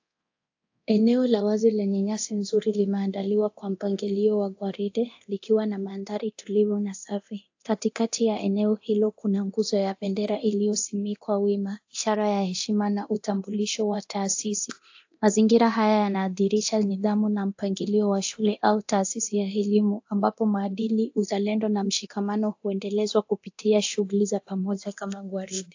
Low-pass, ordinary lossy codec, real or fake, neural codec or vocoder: 7.2 kHz; AAC, 48 kbps; fake; codec, 24 kHz, 0.9 kbps, DualCodec